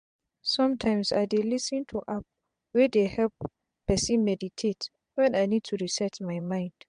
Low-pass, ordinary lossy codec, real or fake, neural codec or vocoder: 9.9 kHz; MP3, 64 kbps; fake; vocoder, 22.05 kHz, 80 mel bands, Vocos